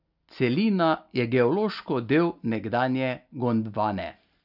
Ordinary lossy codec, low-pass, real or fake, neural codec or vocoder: none; 5.4 kHz; real; none